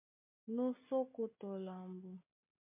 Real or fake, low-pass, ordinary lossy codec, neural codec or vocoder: real; 3.6 kHz; MP3, 24 kbps; none